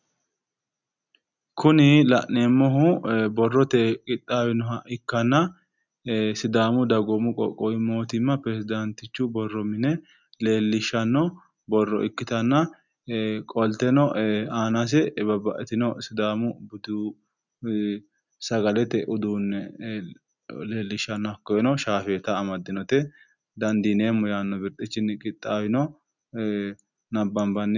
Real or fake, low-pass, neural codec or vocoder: real; 7.2 kHz; none